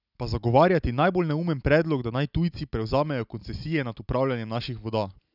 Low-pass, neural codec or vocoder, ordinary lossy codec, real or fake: 5.4 kHz; none; none; real